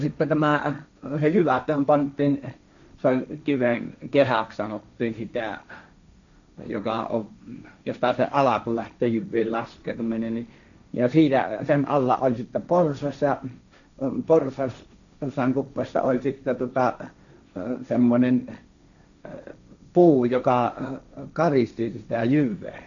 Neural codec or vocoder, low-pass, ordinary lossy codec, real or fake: codec, 16 kHz, 1.1 kbps, Voila-Tokenizer; 7.2 kHz; none; fake